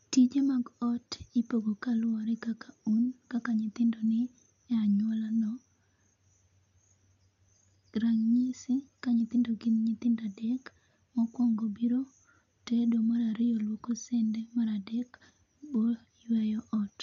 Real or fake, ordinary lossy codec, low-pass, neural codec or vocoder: real; none; 7.2 kHz; none